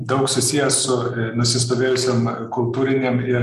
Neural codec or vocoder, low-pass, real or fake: none; 14.4 kHz; real